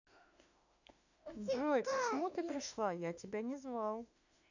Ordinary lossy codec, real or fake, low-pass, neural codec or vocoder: none; fake; 7.2 kHz; autoencoder, 48 kHz, 32 numbers a frame, DAC-VAE, trained on Japanese speech